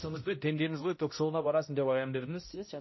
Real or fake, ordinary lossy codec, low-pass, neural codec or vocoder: fake; MP3, 24 kbps; 7.2 kHz; codec, 16 kHz, 0.5 kbps, X-Codec, HuBERT features, trained on balanced general audio